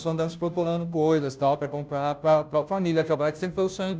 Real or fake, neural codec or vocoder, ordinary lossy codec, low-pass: fake; codec, 16 kHz, 0.5 kbps, FunCodec, trained on Chinese and English, 25 frames a second; none; none